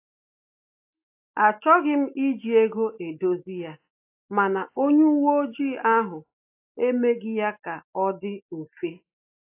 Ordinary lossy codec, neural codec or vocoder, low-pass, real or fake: AAC, 24 kbps; none; 3.6 kHz; real